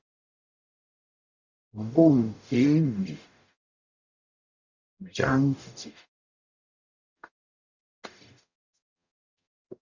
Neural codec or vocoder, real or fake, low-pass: codec, 44.1 kHz, 0.9 kbps, DAC; fake; 7.2 kHz